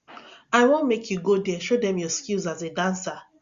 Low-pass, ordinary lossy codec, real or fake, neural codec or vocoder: 7.2 kHz; Opus, 64 kbps; real; none